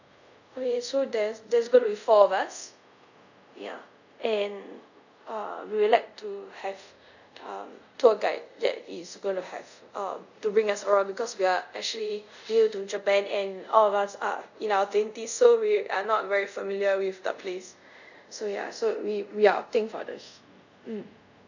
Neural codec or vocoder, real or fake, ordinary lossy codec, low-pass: codec, 24 kHz, 0.5 kbps, DualCodec; fake; none; 7.2 kHz